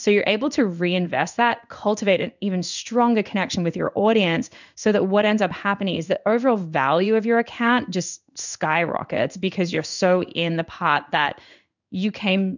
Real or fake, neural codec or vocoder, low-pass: fake; codec, 16 kHz in and 24 kHz out, 1 kbps, XY-Tokenizer; 7.2 kHz